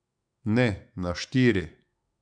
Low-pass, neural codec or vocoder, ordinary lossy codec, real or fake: 9.9 kHz; autoencoder, 48 kHz, 128 numbers a frame, DAC-VAE, trained on Japanese speech; none; fake